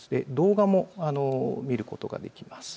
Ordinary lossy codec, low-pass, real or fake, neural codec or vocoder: none; none; real; none